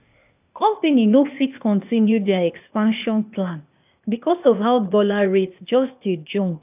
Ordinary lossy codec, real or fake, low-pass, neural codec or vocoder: AAC, 32 kbps; fake; 3.6 kHz; codec, 16 kHz, 0.8 kbps, ZipCodec